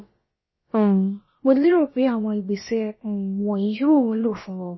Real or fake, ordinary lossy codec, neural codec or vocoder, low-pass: fake; MP3, 24 kbps; codec, 16 kHz, about 1 kbps, DyCAST, with the encoder's durations; 7.2 kHz